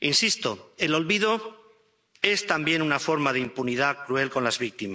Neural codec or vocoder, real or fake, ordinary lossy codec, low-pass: none; real; none; none